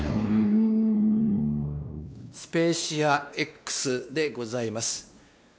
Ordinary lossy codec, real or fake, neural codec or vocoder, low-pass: none; fake; codec, 16 kHz, 2 kbps, X-Codec, WavLM features, trained on Multilingual LibriSpeech; none